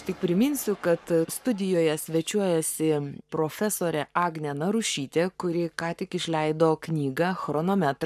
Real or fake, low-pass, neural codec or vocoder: fake; 14.4 kHz; codec, 44.1 kHz, 7.8 kbps, Pupu-Codec